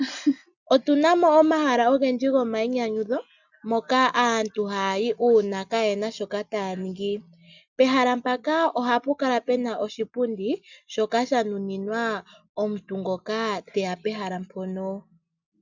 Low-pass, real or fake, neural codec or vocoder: 7.2 kHz; real; none